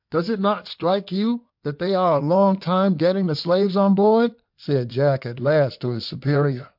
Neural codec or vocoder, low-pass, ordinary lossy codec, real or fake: codec, 16 kHz in and 24 kHz out, 2.2 kbps, FireRedTTS-2 codec; 5.4 kHz; MP3, 48 kbps; fake